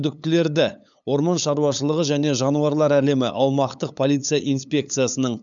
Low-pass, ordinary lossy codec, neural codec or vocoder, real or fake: 7.2 kHz; none; codec, 16 kHz, 8 kbps, FunCodec, trained on LibriTTS, 25 frames a second; fake